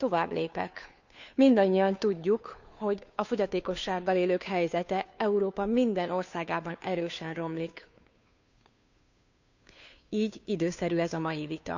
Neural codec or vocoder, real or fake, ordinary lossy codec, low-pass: codec, 16 kHz, 2 kbps, FunCodec, trained on Chinese and English, 25 frames a second; fake; none; 7.2 kHz